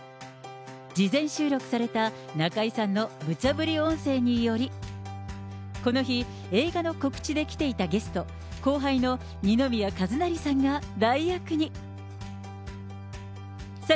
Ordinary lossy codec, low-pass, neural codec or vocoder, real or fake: none; none; none; real